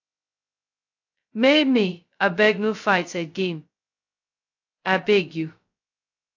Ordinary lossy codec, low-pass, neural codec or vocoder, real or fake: AAC, 48 kbps; 7.2 kHz; codec, 16 kHz, 0.2 kbps, FocalCodec; fake